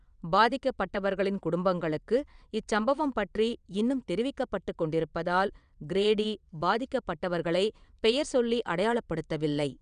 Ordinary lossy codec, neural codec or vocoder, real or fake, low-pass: none; vocoder, 22.05 kHz, 80 mel bands, WaveNeXt; fake; 9.9 kHz